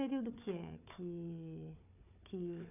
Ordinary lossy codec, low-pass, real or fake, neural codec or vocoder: none; 3.6 kHz; fake; codec, 16 kHz, 4 kbps, FunCodec, trained on Chinese and English, 50 frames a second